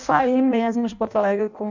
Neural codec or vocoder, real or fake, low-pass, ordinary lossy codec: codec, 16 kHz in and 24 kHz out, 0.6 kbps, FireRedTTS-2 codec; fake; 7.2 kHz; none